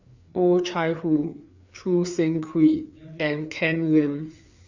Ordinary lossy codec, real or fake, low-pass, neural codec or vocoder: Opus, 64 kbps; fake; 7.2 kHz; codec, 16 kHz, 4 kbps, FreqCodec, larger model